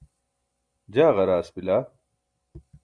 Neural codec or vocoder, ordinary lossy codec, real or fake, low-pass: none; Opus, 64 kbps; real; 9.9 kHz